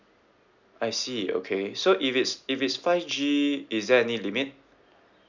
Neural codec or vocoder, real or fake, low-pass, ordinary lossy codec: none; real; 7.2 kHz; none